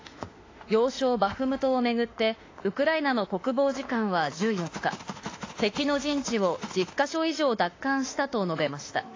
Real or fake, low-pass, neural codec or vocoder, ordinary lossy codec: fake; 7.2 kHz; autoencoder, 48 kHz, 32 numbers a frame, DAC-VAE, trained on Japanese speech; AAC, 32 kbps